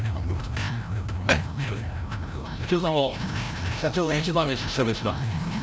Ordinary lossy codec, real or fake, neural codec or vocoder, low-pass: none; fake; codec, 16 kHz, 0.5 kbps, FreqCodec, larger model; none